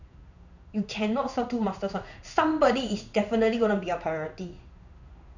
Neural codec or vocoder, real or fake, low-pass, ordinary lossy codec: codec, 16 kHz in and 24 kHz out, 1 kbps, XY-Tokenizer; fake; 7.2 kHz; none